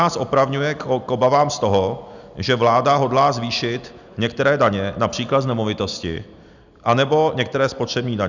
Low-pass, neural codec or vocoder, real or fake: 7.2 kHz; none; real